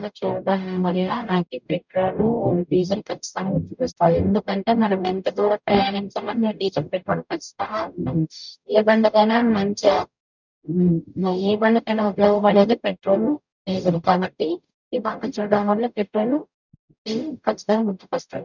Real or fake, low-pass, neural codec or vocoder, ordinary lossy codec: fake; 7.2 kHz; codec, 44.1 kHz, 0.9 kbps, DAC; none